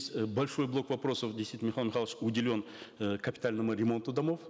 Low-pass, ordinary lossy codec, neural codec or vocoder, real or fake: none; none; none; real